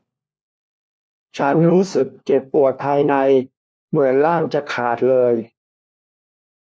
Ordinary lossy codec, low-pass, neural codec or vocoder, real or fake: none; none; codec, 16 kHz, 1 kbps, FunCodec, trained on LibriTTS, 50 frames a second; fake